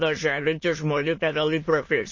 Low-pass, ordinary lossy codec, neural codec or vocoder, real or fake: 7.2 kHz; MP3, 32 kbps; autoencoder, 22.05 kHz, a latent of 192 numbers a frame, VITS, trained on many speakers; fake